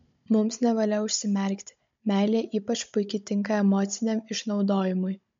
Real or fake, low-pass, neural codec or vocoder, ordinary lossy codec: fake; 7.2 kHz; codec, 16 kHz, 16 kbps, FunCodec, trained on Chinese and English, 50 frames a second; MP3, 48 kbps